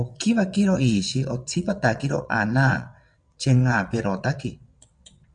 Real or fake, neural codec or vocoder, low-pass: fake; vocoder, 22.05 kHz, 80 mel bands, WaveNeXt; 9.9 kHz